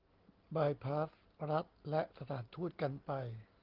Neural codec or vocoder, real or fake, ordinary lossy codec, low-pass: none; real; Opus, 16 kbps; 5.4 kHz